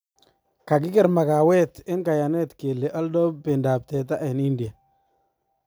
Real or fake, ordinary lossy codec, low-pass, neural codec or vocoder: real; none; none; none